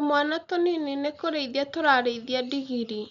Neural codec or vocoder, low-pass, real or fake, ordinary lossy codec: none; 7.2 kHz; real; none